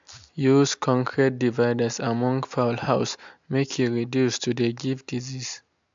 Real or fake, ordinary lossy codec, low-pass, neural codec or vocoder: real; MP3, 64 kbps; 7.2 kHz; none